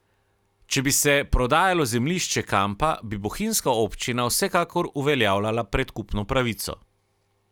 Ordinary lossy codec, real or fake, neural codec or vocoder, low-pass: none; real; none; 19.8 kHz